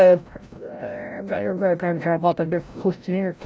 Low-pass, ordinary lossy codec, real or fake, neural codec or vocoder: none; none; fake; codec, 16 kHz, 0.5 kbps, FreqCodec, larger model